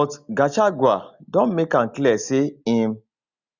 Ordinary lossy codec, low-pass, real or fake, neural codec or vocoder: Opus, 64 kbps; 7.2 kHz; real; none